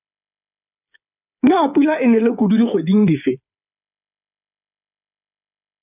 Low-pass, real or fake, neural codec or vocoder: 3.6 kHz; fake; codec, 16 kHz, 8 kbps, FreqCodec, smaller model